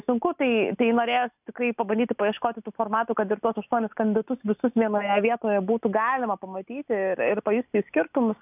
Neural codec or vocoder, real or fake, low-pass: none; real; 3.6 kHz